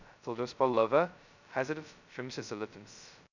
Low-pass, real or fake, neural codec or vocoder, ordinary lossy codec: 7.2 kHz; fake; codec, 16 kHz, 0.2 kbps, FocalCodec; none